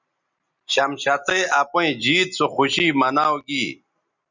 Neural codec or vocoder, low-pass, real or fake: none; 7.2 kHz; real